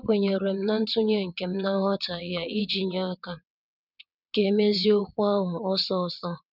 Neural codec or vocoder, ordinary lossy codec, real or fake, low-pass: vocoder, 22.05 kHz, 80 mel bands, WaveNeXt; none; fake; 5.4 kHz